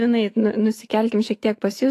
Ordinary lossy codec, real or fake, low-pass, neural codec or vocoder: AAC, 48 kbps; fake; 14.4 kHz; autoencoder, 48 kHz, 128 numbers a frame, DAC-VAE, trained on Japanese speech